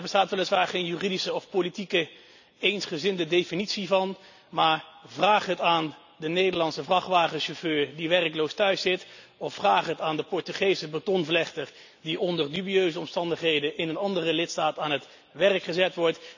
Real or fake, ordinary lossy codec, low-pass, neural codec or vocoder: real; none; 7.2 kHz; none